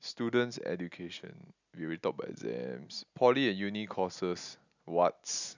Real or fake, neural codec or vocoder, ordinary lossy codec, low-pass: real; none; none; 7.2 kHz